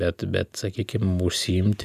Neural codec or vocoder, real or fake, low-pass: none; real; 14.4 kHz